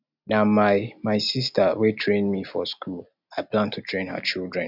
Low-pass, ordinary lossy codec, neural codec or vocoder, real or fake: 5.4 kHz; none; none; real